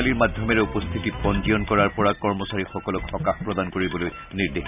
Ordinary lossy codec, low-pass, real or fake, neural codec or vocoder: none; 3.6 kHz; real; none